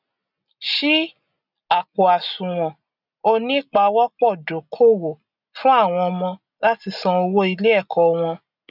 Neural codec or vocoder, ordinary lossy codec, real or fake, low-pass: none; none; real; 5.4 kHz